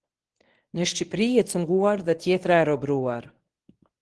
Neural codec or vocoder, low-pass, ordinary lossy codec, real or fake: codec, 24 kHz, 0.9 kbps, WavTokenizer, medium speech release version 1; 10.8 kHz; Opus, 16 kbps; fake